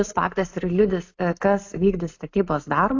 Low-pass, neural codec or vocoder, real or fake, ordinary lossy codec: 7.2 kHz; none; real; AAC, 48 kbps